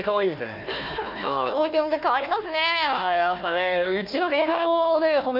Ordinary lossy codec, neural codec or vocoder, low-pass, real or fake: none; codec, 16 kHz, 1 kbps, FunCodec, trained on Chinese and English, 50 frames a second; 5.4 kHz; fake